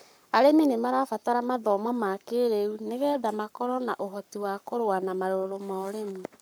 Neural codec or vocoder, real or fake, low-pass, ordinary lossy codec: codec, 44.1 kHz, 7.8 kbps, Pupu-Codec; fake; none; none